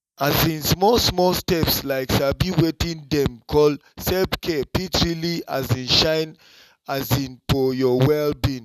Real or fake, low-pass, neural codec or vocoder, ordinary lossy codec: real; 14.4 kHz; none; none